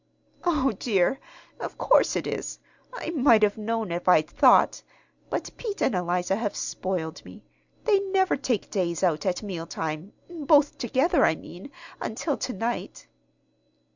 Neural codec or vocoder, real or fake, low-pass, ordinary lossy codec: none; real; 7.2 kHz; Opus, 64 kbps